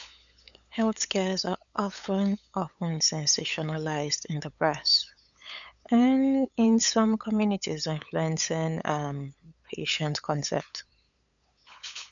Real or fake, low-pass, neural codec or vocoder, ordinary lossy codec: fake; 7.2 kHz; codec, 16 kHz, 8 kbps, FunCodec, trained on LibriTTS, 25 frames a second; none